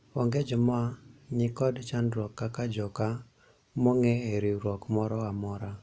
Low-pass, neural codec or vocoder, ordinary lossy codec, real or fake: none; none; none; real